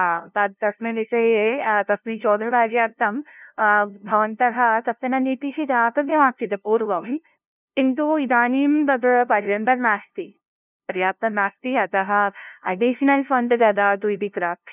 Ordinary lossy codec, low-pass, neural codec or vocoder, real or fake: none; 3.6 kHz; codec, 16 kHz, 0.5 kbps, FunCodec, trained on LibriTTS, 25 frames a second; fake